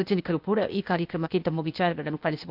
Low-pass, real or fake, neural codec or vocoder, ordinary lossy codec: 5.4 kHz; fake; codec, 16 kHz, 0.8 kbps, ZipCodec; none